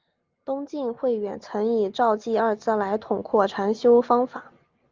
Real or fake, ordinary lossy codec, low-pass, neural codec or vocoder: real; Opus, 32 kbps; 7.2 kHz; none